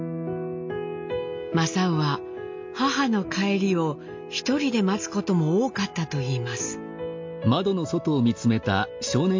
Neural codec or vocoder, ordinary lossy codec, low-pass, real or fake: none; MP3, 48 kbps; 7.2 kHz; real